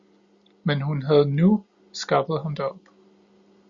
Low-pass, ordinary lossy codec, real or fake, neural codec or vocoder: 7.2 kHz; Opus, 64 kbps; real; none